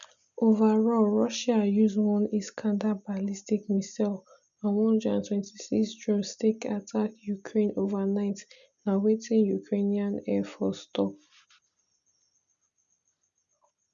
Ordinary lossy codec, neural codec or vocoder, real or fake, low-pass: none; none; real; 7.2 kHz